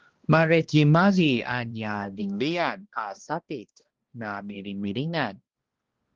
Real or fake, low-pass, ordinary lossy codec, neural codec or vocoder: fake; 7.2 kHz; Opus, 16 kbps; codec, 16 kHz, 1 kbps, X-Codec, HuBERT features, trained on balanced general audio